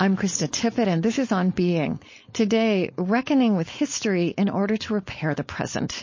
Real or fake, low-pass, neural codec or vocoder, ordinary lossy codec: fake; 7.2 kHz; codec, 16 kHz, 4.8 kbps, FACodec; MP3, 32 kbps